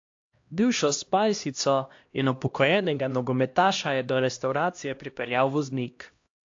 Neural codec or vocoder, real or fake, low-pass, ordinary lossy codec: codec, 16 kHz, 1 kbps, X-Codec, HuBERT features, trained on LibriSpeech; fake; 7.2 kHz; AAC, 48 kbps